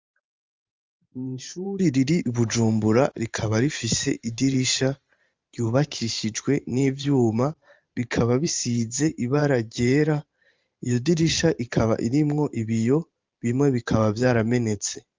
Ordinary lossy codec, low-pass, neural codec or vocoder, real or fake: Opus, 32 kbps; 7.2 kHz; vocoder, 44.1 kHz, 128 mel bands every 512 samples, BigVGAN v2; fake